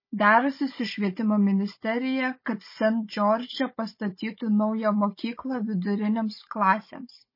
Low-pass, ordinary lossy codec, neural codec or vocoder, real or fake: 5.4 kHz; MP3, 24 kbps; codec, 16 kHz, 16 kbps, FunCodec, trained on Chinese and English, 50 frames a second; fake